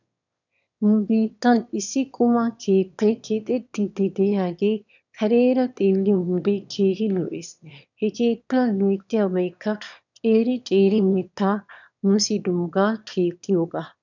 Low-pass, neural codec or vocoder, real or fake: 7.2 kHz; autoencoder, 22.05 kHz, a latent of 192 numbers a frame, VITS, trained on one speaker; fake